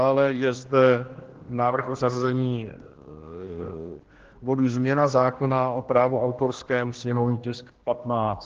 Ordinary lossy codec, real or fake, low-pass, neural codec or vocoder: Opus, 16 kbps; fake; 7.2 kHz; codec, 16 kHz, 1 kbps, X-Codec, HuBERT features, trained on general audio